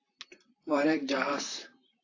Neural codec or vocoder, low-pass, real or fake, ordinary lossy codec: vocoder, 44.1 kHz, 128 mel bands, Pupu-Vocoder; 7.2 kHz; fake; AAC, 32 kbps